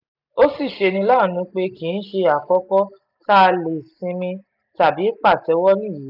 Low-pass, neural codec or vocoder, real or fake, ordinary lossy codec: 5.4 kHz; none; real; none